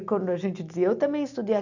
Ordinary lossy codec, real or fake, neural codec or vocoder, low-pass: none; real; none; 7.2 kHz